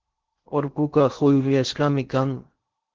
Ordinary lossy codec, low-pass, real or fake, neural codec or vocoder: Opus, 16 kbps; 7.2 kHz; fake; codec, 16 kHz in and 24 kHz out, 0.8 kbps, FocalCodec, streaming, 65536 codes